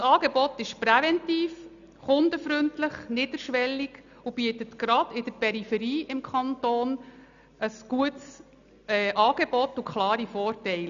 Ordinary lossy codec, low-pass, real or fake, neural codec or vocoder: none; 7.2 kHz; real; none